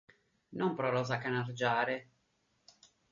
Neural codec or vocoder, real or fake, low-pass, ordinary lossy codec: none; real; 7.2 kHz; MP3, 32 kbps